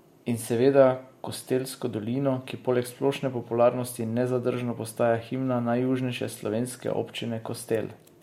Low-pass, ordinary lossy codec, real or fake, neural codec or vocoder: 19.8 kHz; MP3, 64 kbps; real; none